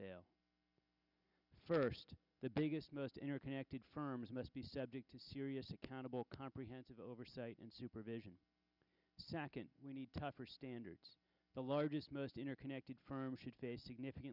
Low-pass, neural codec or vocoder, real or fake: 5.4 kHz; none; real